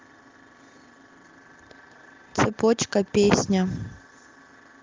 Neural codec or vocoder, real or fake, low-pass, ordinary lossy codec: none; real; 7.2 kHz; Opus, 32 kbps